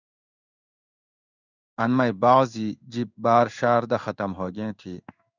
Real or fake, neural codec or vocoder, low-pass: fake; codec, 16 kHz in and 24 kHz out, 1 kbps, XY-Tokenizer; 7.2 kHz